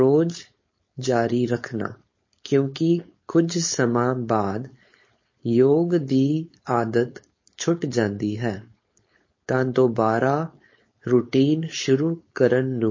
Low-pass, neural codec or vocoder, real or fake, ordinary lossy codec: 7.2 kHz; codec, 16 kHz, 4.8 kbps, FACodec; fake; MP3, 32 kbps